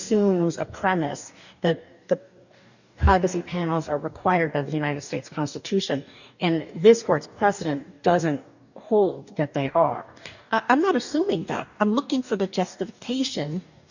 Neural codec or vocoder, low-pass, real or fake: codec, 44.1 kHz, 2.6 kbps, DAC; 7.2 kHz; fake